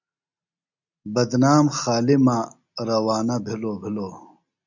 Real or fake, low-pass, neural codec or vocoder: real; 7.2 kHz; none